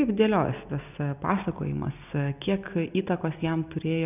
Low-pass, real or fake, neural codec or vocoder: 3.6 kHz; real; none